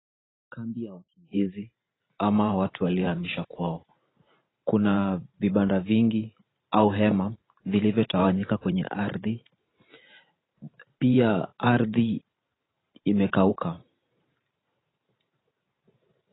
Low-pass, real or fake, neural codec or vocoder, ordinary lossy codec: 7.2 kHz; fake; vocoder, 44.1 kHz, 128 mel bands every 512 samples, BigVGAN v2; AAC, 16 kbps